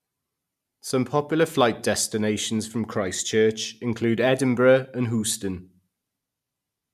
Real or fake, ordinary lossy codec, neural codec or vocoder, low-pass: real; none; none; 14.4 kHz